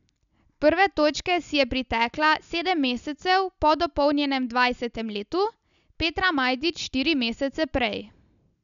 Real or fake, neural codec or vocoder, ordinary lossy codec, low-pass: real; none; none; 7.2 kHz